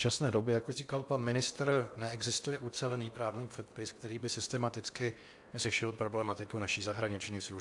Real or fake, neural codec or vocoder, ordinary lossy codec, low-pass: fake; codec, 16 kHz in and 24 kHz out, 0.8 kbps, FocalCodec, streaming, 65536 codes; AAC, 64 kbps; 10.8 kHz